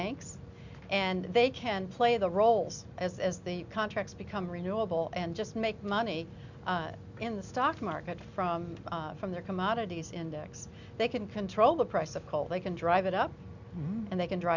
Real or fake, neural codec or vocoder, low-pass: real; none; 7.2 kHz